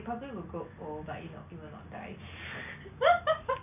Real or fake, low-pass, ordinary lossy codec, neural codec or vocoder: real; 3.6 kHz; none; none